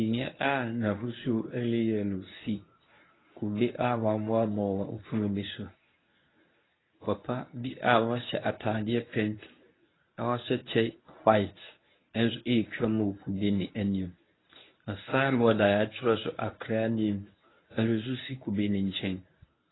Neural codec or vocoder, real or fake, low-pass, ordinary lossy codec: codec, 24 kHz, 0.9 kbps, WavTokenizer, medium speech release version 1; fake; 7.2 kHz; AAC, 16 kbps